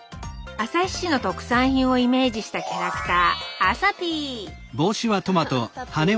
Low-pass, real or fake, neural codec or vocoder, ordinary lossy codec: none; real; none; none